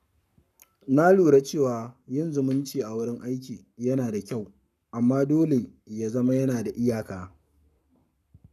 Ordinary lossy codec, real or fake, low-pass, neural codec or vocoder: none; fake; 14.4 kHz; codec, 44.1 kHz, 7.8 kbps, Pupu-Codec